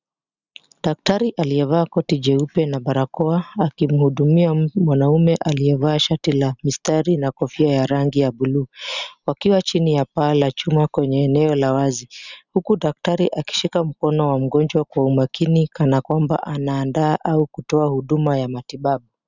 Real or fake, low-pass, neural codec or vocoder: real; 7.2 kHz; none